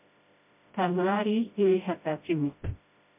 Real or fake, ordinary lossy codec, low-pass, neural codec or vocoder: fake; MP3, 32 kbps; 3.6 kHz; codec, 16 kHz, 0.5 kbps, FreqCodec, smaller model